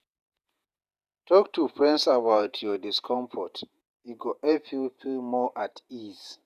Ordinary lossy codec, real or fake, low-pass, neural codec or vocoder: none; fake; 14.4 kHz; vocoder, 48 kHz, 128 mel bands, Vocos